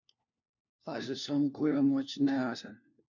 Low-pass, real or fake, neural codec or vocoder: 7.2 kHz; fake; codec, 16 kHz, 1 kbps, FunCodec, trained on LibriTTS, 50 frames a second